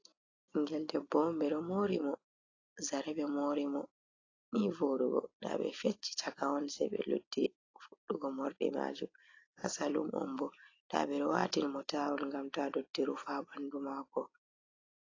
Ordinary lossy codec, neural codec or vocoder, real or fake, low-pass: AAC, 32 kbps; none; real; 7.2 kHz